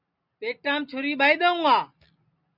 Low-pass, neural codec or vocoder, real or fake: 5.4 kHz; none; real